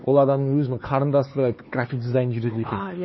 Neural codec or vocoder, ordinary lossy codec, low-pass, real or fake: codec, 16 kHz, 4 kbps, X-Codec, HuBERT features, trained on LibriSpeech; MP3, 24 kbps; 7.2 kHz; fake